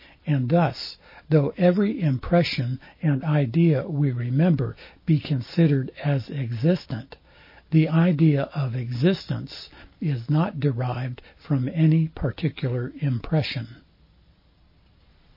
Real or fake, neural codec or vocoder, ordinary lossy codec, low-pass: real; none; MP3, 24 kbps; 5.4 kHz